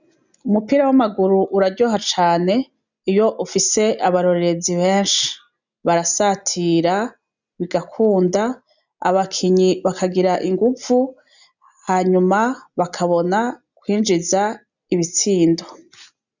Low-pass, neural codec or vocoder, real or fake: 7.2 kHz; none; real